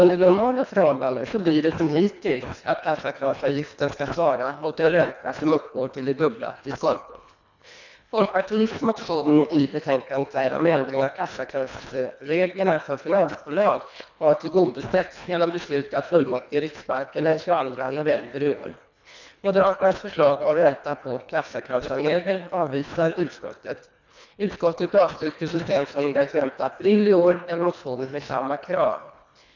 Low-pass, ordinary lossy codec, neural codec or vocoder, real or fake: 7.2 kHz; none; codec, 24 kHz, 1.5 kbps, HILCodec; fake